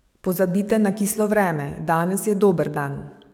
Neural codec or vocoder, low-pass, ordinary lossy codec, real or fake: codec, 44.1 kHz, 7.8 kbps, DAC; 19.8 kHz; none; fake